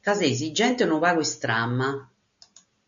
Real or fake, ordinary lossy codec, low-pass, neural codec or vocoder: real; MP3, 64 kbps; 7.2 kHz; none